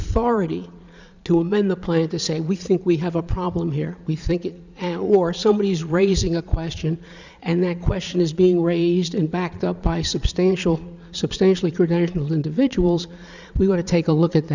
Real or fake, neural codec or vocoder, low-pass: fake; vocoder, 22.05 kHz, 80 mel bands, Vocos; 7.2 kHz